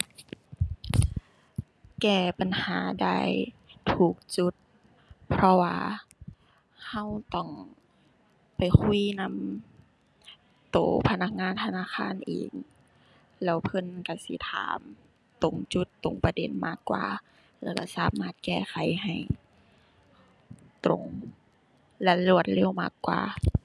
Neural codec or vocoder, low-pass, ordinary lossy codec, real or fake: none; none; none; real